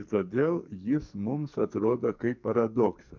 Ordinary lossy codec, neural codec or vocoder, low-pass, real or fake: MP3, 64 kbps; codec, 44.1 kHz, 2.6 kbps, SNAC; 7.2 kHz; fake